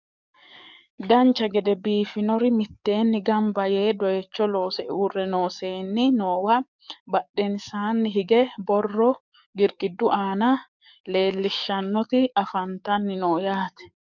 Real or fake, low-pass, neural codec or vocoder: fake; 7.2 kHz; codec, 44.1 kHz, 7.8 kbps, DAC